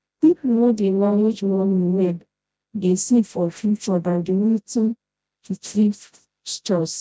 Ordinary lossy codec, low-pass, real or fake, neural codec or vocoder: none; none; fake; codec, 16 kHz, 0.5 kbps, FreqCodec, smaller model